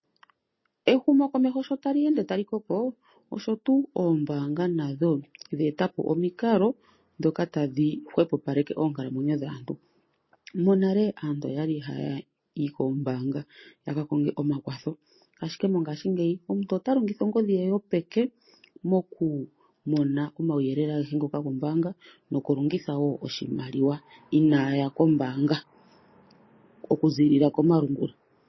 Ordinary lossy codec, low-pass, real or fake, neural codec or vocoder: MP3, 24 kbps; 7.2 kHz; real; none